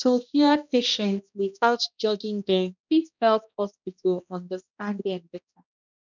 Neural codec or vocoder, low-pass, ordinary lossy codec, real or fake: codec, 16 kHz, 1 kbps, X-Codec, HuBERT features, trained on balanced general audio; 7.2 kHz; none; fake